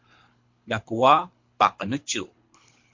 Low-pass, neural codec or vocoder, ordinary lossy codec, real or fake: 7.2 kHz; codec, 24 kHz, 3 kbps, HILCodec; MP3, 48 kbps; fake